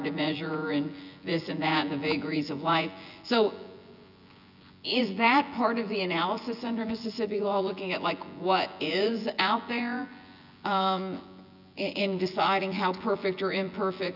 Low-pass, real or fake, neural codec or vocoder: 5.4 kHz; fake; vocoder, 24 kHz, 100 mel bands, Vocos